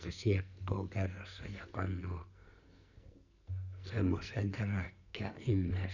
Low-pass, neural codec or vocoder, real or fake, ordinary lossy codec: 7.2 kHz; codec, 44.1 kHz, 2.6 kbps, SNAC; fake; none